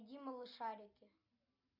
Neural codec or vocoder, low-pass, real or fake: none; 5.4 kHz; real